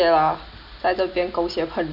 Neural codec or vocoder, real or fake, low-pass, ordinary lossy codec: none; real; 5.4 kHz; none